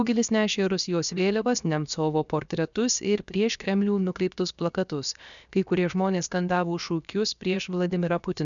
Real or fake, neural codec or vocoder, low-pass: fake; codec, 16 kHz, 0.7 kbps, FocalCodec; 7.2 kHz